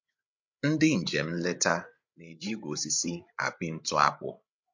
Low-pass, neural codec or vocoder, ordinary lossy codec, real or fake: 7.2 kHz; codec, 16 kHz, 8 kbps, FreqCodec, larger model; MP3, 48 kbps; fake